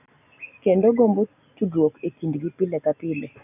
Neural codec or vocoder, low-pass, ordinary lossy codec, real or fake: none; 3.6 kHz; none; real